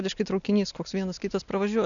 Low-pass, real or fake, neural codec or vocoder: 7.2 kHz; real; none